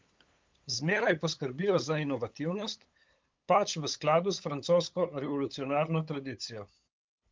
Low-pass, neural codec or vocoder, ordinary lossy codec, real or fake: 7.2 kHz; codec, 16 kHz, 8 kbps, FunCodec, trained on LibriTTS, 25 frames a second; Opus, 24 kbps; fake